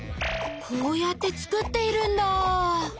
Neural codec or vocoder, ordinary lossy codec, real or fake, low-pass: none; none; real; none